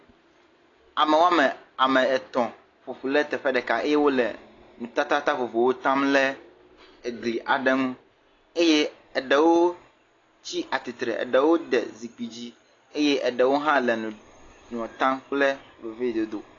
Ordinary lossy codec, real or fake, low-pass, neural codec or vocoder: AAC, 32 kbps; real; 7.2 kHz; none